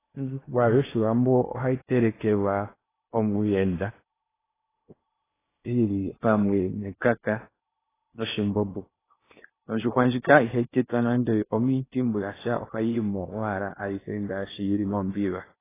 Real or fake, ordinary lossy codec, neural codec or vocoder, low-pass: fake; AAC, 16 kbps; codec, 16 kHz in and 24 kHz out, 0.8 kbps, FocalCodec, streaming, 65536 codes; 3.6 kHz